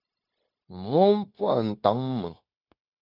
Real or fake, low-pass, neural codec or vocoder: fake; 5.4 kHz; codec, 16 kHz, 0.9 kbps, LongCat-Audio-Codec